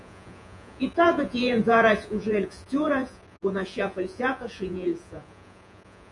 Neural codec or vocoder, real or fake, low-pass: vocoder, 48 kHz, 128 mel bands, Vocos; fake; 10.8 kHz